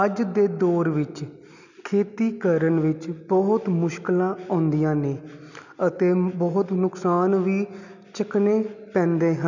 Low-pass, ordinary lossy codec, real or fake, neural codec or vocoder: 7.2 kHz; none; real; none